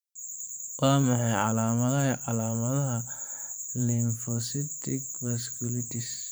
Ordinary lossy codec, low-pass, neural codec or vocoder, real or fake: none; none; vocoder, 44.1 kHz, 128 mel bands every 512 samples, BigVGAN v2; fake